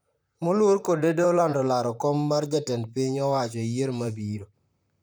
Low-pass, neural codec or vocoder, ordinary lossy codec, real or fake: none; vocoder, 44.1 kHz, 128 mel bands, Pupu-Vocoder; none; fake